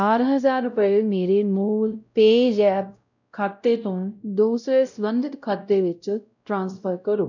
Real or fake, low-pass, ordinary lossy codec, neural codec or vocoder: fake; 7.2 kHz; none; codec, 16 kHz, 0.5 kbps, X-Codec, WavLM features, trained on Multilingual LibriSpeech